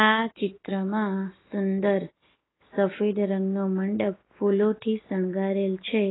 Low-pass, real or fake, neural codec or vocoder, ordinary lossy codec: 7.2 kHz; real; none; AAC, 16 kbps